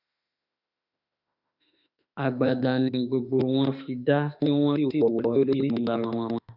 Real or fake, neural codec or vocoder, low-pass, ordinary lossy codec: fake; autoencoder, 48 kHz, 32 numbers a frame, DAC-VAE, trained on Japanese speech; 5.4 kHz; none